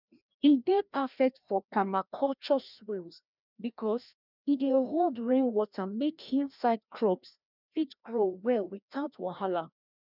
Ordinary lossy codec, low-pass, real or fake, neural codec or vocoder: none; 5.4 kHz; fake; codec, 16 kHz, 1 kbps, FreqCodec, larger model